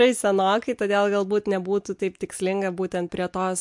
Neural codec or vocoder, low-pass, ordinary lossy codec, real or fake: none; 10.8 kHz; MP3, 64 kbps; real